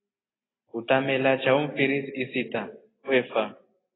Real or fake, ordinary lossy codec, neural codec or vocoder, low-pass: real; AAC, 16 kbps; none; 7.2 kHz